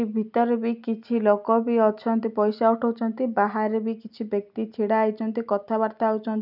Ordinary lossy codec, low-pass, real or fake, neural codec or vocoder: none; 5.4 kHz; real; none